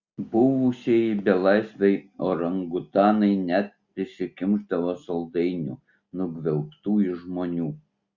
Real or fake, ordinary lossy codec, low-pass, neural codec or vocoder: real; Opus, 64 kbps; 7.2 kHz; none